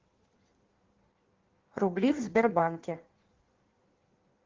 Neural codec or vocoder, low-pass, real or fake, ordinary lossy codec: codec, 16 kHz in and 24 kHz out, 1.1 kbps, FireRedTTS-2 codec; 7.2 kHz; fake; Opus, 16 kbps